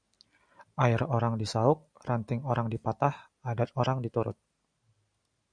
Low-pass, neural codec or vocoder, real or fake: 9.9 kHz; none; real